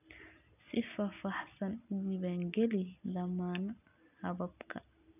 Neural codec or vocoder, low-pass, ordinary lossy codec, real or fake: none; 3.6 kHz; none; real